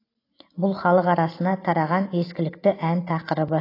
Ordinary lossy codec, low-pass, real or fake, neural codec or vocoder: AAC, 24 kbps; 5.4 kHz; real; none